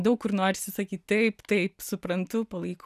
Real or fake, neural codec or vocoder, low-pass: real; none; 14.4 kHz